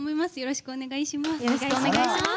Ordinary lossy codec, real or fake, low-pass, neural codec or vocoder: none; real; none; none